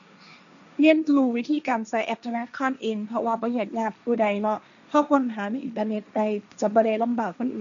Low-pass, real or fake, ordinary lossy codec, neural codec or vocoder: 7.2 kHz; fake; none; codec, 16 kHz, 1.1 kbps, Voila-Tokenizer